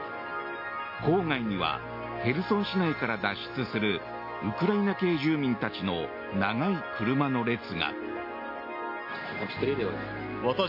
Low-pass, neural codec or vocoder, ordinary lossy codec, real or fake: 5.4 kHz; none; MP3, 32 kbps; real